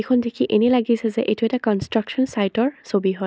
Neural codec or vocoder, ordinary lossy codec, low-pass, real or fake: none; none; none; real